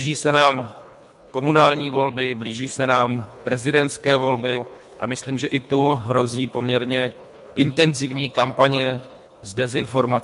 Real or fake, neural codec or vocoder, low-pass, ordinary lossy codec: fake; codec, 24 kHz, 1.5 kbps, HILCodec; 10.8 kHz; MP3, 64 kbps